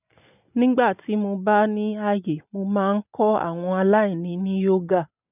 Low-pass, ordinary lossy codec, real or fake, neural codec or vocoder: 3.6 kHz; none; real; none